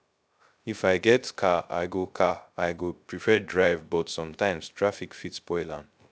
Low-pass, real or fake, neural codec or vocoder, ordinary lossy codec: none; fake; codec, 16 kHz, 0.3 kbps, FocalCodec; none